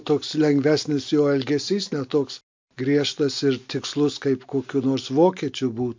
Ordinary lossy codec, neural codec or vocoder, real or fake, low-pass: MP3, 48 kbps; none; real; 7.2 kHz